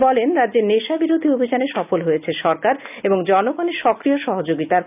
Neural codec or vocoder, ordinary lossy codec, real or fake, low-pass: none; none; real; 3.6 kHz